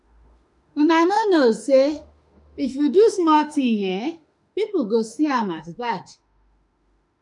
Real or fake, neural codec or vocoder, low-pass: fake; autoencoder, 48 kHz, 32 numbers a frame, DAC-VAE, trained on Japanese speech; 10.8 kHz